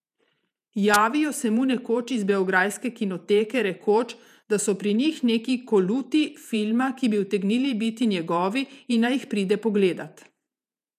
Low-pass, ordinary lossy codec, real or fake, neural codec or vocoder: 14.4 kHz; none; fake; vocoder, 48 kHz, 128 mel bands, Vocos